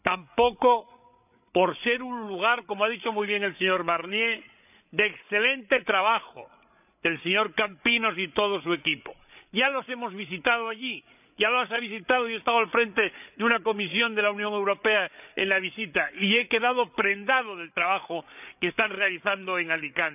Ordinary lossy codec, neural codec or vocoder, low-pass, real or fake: none; codec, 16 kHz, 8 kbps, FreqCodec, larger model; 3.6 kHz; fake